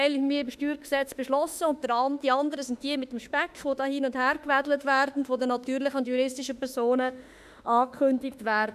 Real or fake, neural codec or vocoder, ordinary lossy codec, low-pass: fake; autoencoder, 48 kHz, 32 numbers a frame, DAC-VAE, trained on Japanese speech; none; 14.4 kHz